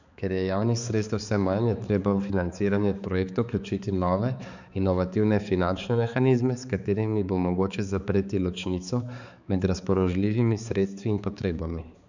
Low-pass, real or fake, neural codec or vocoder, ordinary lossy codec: 7.2 kHz; fake; codec, 16 kHz, 4 kbps, X-Codec, HuBERT features, trained on balanced general audio; none